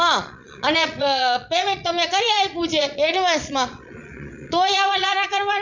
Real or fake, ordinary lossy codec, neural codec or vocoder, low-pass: fake; none; vocoder, 22.05 kHz, 80 mel bands, Vocos; 7.2 kHz